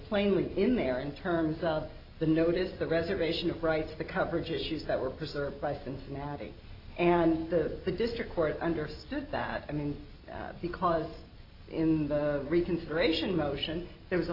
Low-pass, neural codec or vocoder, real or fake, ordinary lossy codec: 5.4 kHz; none; real; AAC, 32 kbps